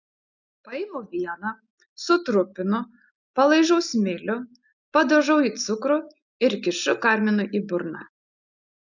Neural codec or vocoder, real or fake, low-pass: none; real; 7.2 kHz